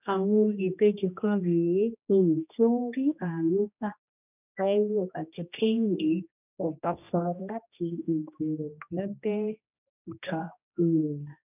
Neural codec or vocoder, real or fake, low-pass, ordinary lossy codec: codec, 16 kHz, 1 kbps, X-Codec, HuBERT features, trained on general audio; fake; 3.6 kHz; none